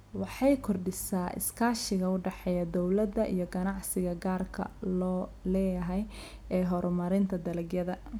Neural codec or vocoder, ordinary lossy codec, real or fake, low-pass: none; none; real; none